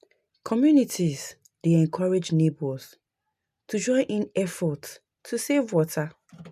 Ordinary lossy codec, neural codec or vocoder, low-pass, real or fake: none; none; 14.4 kHz; real